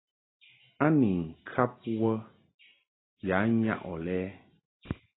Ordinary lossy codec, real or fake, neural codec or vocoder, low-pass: AAC, 16 kbps; real; none; 7.2 kHz